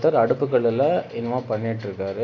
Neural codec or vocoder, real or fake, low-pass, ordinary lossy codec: none; real; 7.2 kHz; AAC, 48 kbps